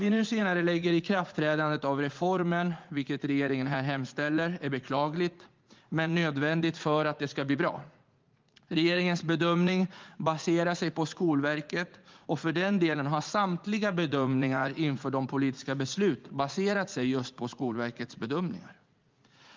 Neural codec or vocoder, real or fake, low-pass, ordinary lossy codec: vocoder, 44.1 kHz, 80 mel bands, Vocos; fake; 7.2 kHz; Opus, 32 kbps